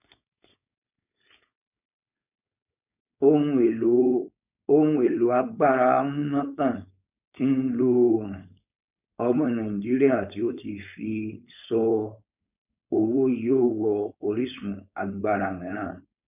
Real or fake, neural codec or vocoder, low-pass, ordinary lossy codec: fake; codec, 16 kHz, 4.8 kbps, FACodec; 3.6 kHz; none